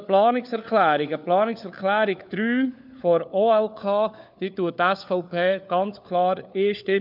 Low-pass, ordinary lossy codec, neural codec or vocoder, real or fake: 5.4 kHz; none; codec, 16 kHz, 4 kbps, FunCodec, trained on LibriTTS, 50 frames a second; fake